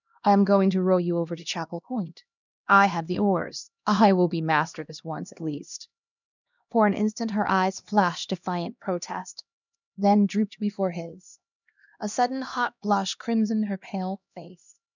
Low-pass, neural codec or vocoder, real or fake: 7.2 kHz; codec, 16 kHz, 1 kbps, X-Codec, HuBERT features, trained on LibriSpeech; fake